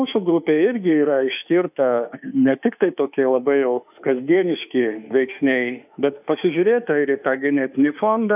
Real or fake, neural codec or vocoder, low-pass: fake; autoencoder, 48 kHz, 32 numbers a frame, DAC-VAE, trained on Japanese speech; 3.6 kHz